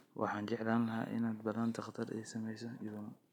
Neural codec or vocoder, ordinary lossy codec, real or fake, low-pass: none; none; real; 19.8 kHz